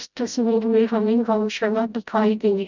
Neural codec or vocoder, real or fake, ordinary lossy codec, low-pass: codec, 16 kHz, 0.5 kbps, FreqCodec, smaller model; fake; none; 7.2 kHz